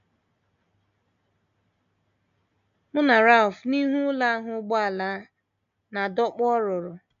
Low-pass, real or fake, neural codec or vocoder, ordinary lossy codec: 7.2 kHz; real; none; none